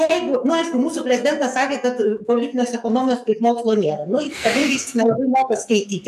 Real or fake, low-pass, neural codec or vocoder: fake; 14.4 kHz; codec, 44.1 kHz, 2.6 kbps, SNAC